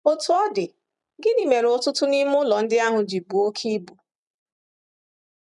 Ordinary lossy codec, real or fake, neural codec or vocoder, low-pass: none; fake; vocoder, 44.1 kHz, 128 mel bands every 256 samples, BigVGAN v2; 10.8 kHz